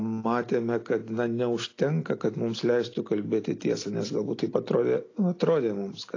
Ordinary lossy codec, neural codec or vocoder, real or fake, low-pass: AAC, 32 kbps; none; real; 7.2 kHz